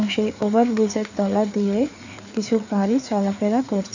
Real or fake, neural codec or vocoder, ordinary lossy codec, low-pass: fake; codec, 16 kHz, 4 kbps, FreqCodec, larger model; none; 7.2 kHz